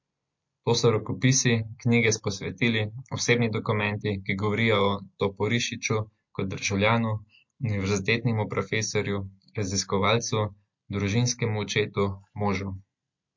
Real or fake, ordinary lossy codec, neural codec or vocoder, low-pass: real; MP3, 48 kbps; none; 7.2 kHz